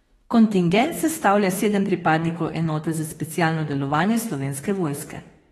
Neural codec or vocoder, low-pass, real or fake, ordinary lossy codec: autoencoder, 48 kHz, 32 numbers a frame, DAC-VAE, trained on Japanese speech; 19.8 kHz; fake; AAC, 32 kbps